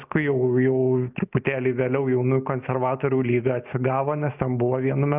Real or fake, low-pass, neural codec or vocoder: real; 3.6 kHz; none